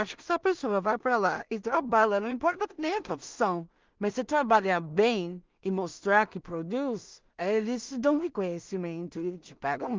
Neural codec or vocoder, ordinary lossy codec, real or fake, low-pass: codec, 16 kHz in and 24 kHz out, 0.4 kbps, LongCat-Audio-Codec, two codebook decoder; Opus, 24 kbps; fake; 7.2 kHz